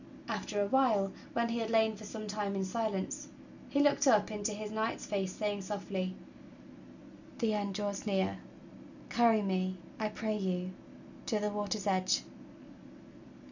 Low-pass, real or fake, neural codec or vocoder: 7.2 kHz; real; none